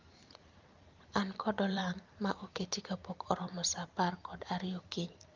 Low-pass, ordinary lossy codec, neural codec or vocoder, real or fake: 7.2 kHz; Opus, 24 kbps; vocoder, 44.1 kHz, 128 mel bands every 512 samples, BigVGAN v2; fake